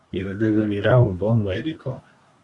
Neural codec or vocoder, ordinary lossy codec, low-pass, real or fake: codec, 24 kHz, 1 kbps, SNAC; Opus, 64 kbps; 10.8 kHz; fake